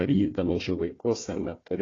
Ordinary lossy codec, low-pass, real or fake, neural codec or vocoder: AAC, 32 kbps; 7.2 kHz; fake; codec, 16 kHz, 1 kbps, FunCodec, trained on Chinese and English, 50 frames a second